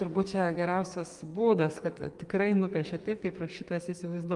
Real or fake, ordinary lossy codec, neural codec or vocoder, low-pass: fake; Opus, 32 kbps; codec, 44.1 kHz, 2.6 kbps, SNAC; 10.8 kHz